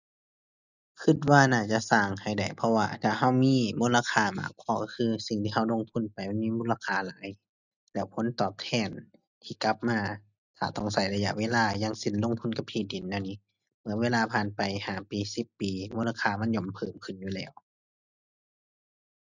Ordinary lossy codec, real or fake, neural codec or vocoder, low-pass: none; real; none; 7.2 kHz